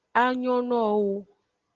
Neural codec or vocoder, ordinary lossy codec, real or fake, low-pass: none; Opus, 16 kbps; real; 7.2 kHz